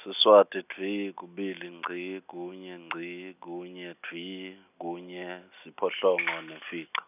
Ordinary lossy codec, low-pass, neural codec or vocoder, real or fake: none; 3.6 kHz; none; real